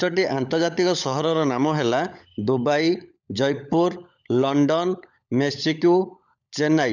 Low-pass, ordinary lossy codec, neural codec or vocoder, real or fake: 7.2 kHz; none; codec, 16 kHz, 16 kbps, FunCodec, trained on LibriTTS, 50 frames a second; fake